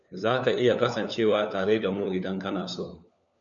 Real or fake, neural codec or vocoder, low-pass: fake; codec, 16 kHz, 4 kbps, FunCodec, trained on LibriTTS, 50 frames a second; 7.2 kHz